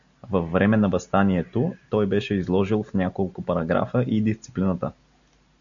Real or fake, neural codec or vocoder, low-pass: real; none; 7.2 kHz